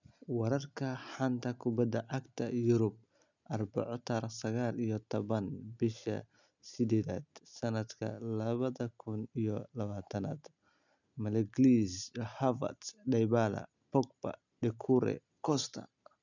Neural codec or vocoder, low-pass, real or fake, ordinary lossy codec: none; 7.2 kHz; real; none